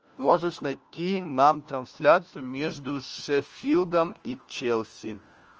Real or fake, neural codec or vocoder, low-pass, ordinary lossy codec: fake; codec, 16 kHz, 1 kbps, FunCodec, trained on LibriTTS, 50 frames a second; 7.2 kHz; Opus, 24 kbps